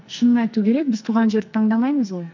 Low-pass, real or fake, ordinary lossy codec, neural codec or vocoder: 7.2 kHz; fake; MP3, 64 kbps; codec, 44.1 kHz, 2.6 kbps, SNAC